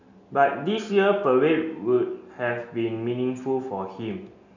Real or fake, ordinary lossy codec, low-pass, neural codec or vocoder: real; none; 7.2 kHz; none